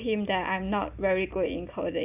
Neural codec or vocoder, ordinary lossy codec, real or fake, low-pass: none; AAC, 32 kbps; real; 3.6 kHz